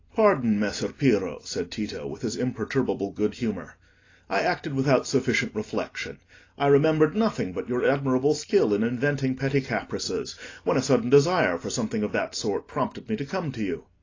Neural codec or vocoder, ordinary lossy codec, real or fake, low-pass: none; AAC, 32 kbps; real; 7.2 kHz